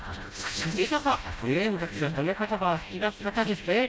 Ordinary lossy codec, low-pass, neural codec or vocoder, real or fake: none; none; codec, 16 kHz, 0.5 kbps, FreqCodec, smaller model; fake